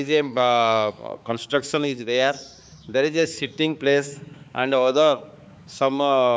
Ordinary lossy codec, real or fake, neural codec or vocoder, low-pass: none; fake; codec, 16 kHz, 4 kbps, X-Codec, HuBERT features, trained on balanced general audio; none